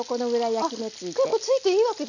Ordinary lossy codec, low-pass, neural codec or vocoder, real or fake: none; 7.2 kHz; none; real